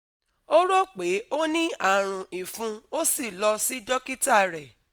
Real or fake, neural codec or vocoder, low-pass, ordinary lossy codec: real; none; none; none